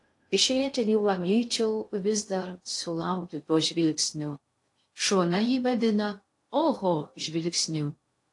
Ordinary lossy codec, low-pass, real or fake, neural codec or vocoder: AAC, 48 kbps; 10.8 kHz; fake; codec, 16 kHz in and 24 kHz out, 0.6 kbps, FocalCodec, streaming, 2048 codes